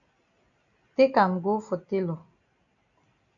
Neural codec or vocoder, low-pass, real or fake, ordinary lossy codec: none; 7.2 kHz; real; MP3, 96 kbps